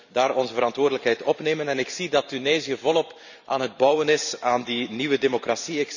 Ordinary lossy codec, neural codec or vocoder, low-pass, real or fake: none; vocoder, 44.1 kHz, 128 mel bands every 512 samples, BigVGAN v2; 7.2 kHz; fake